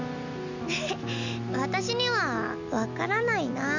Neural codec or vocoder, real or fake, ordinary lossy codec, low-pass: none; real; none; 7.2 kHz